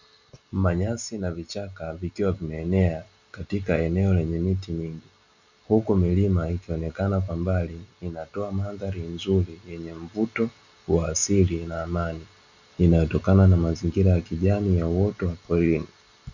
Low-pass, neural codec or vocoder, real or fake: 7.2 kHz; none; real